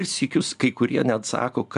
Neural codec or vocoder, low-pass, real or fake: none; 10.8 kHz; real